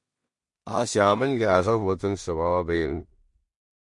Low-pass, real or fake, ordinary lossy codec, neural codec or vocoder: 10.8 kHz; fake; MP3, 48 kbps; codec, 16 kHz in and 24 kHz out, 0.4 kbps, LongCat-Audio-Codec, two codebook decoder